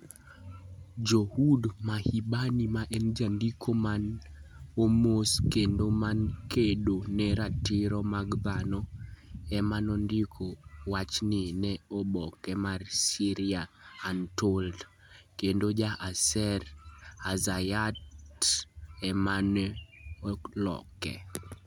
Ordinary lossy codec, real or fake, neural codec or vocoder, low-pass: none; real; none; 19.8 kHz